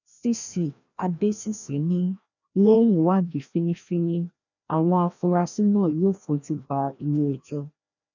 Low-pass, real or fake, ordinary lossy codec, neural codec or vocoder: 7.2 kHz; fake; none; codec, 16 kHz, 1 kbps, FreqCodec, larger model